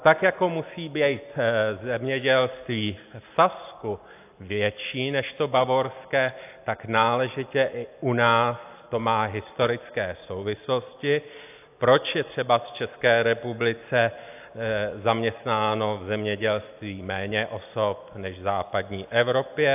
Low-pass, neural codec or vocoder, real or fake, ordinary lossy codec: 3.6 kHz; none; real; AAC, 32 kbps